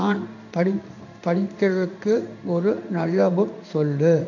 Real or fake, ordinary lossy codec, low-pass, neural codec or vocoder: fake; none; 7.2 kHz; codec, 16 kHz in and 24 kHz out, 1 kbps, XY-Tokenizer